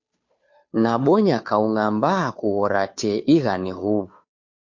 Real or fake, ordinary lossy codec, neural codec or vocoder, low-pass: fake; MP3, 48 kbps; codec, 16 kHz, 2 kbps, FunCodec, trained on Chinese and English, 25 frames a second; 7.2 kHz